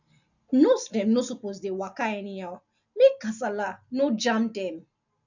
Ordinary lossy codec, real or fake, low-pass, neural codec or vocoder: none; real; 7.2 kHz; none